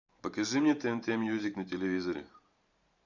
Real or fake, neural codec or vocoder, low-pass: fake; vocoder, 44.1 kHz, 128 mel bands every 512 samples, BigVGAN v2; 7.2 kHz